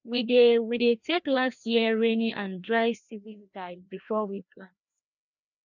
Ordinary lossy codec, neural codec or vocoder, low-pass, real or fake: none; codec, 16 kHz, 1 kbps, FreqCodec, larger model; 7.2 kHz; fake